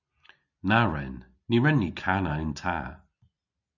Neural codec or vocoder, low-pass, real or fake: none; 7.2 kHz; real